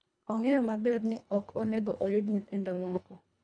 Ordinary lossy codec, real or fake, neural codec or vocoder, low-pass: none; fake; codec, 24 kHz, 1.5 kbps, HILCodec; 9.9 kHz